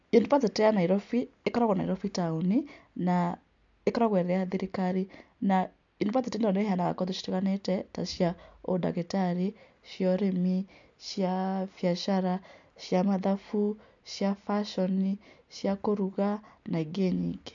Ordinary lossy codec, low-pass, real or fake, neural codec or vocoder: MP3, 64 kbps; 7.2 kHz; real; none